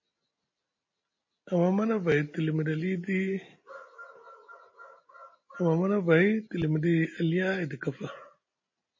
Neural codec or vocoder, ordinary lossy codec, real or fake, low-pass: none; MP3, 32 kbps; real; 7.2 kHz